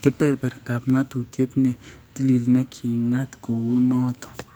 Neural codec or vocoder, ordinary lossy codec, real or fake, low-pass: codec, 44.1 kHz, 2.6 kbps, SNAC; none; fake; none